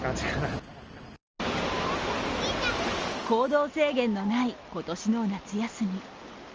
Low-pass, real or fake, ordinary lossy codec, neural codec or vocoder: 7.2 kHz; real; Opus, 24 kbps; none